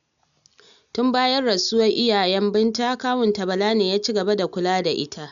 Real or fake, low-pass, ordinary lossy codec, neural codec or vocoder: real; 7.2 kHz; none; none